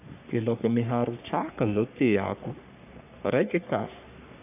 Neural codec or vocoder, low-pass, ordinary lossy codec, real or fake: codec, 44.1 kHz, 3.4 kbps, Pupu-Codec; 3.6 kHz; none; fake